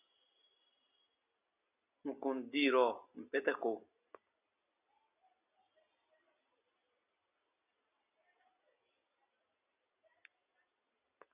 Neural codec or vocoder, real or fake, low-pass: none; real; 3.6 kHz